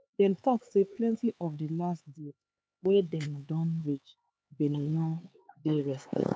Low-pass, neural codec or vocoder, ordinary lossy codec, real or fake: none; codec, 16 kHz, 4 kbps, X-Codec, HuBERT features, trained on LibriSpeech; none; fake